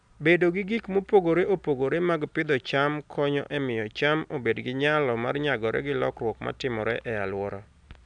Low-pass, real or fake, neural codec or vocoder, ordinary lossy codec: 9.9 kHz; real; none; none